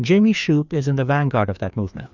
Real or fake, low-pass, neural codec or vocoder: fake; 7.2 kHz; codec, 16 kHz, 2 kbps, FreqCodec, larger model